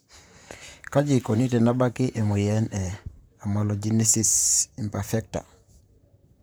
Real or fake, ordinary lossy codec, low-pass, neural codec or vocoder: fake; none; none; vocoder, 44.1 kHz, 128 mel bands, Pupu-Vocoder